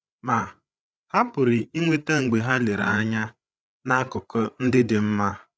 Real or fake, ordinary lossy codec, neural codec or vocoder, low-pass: fake; none; codec, 16 kHz, 8 kbps, FreqCodec, larger model; none